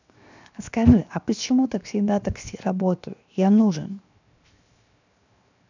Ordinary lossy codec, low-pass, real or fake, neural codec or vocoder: none; 7.2 kHz; fake; codec, 16 kHz, 0.7 kbps, FocalCodec